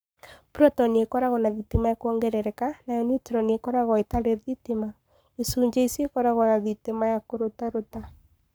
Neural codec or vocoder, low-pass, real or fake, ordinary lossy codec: codec, 44.1 kHz, 7.8 kbps, Pupu-Codec; none; fake; none